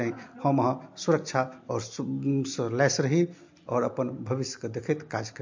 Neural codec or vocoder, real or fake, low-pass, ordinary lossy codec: none; real; 7.2 kHz; MP3, 48 kbps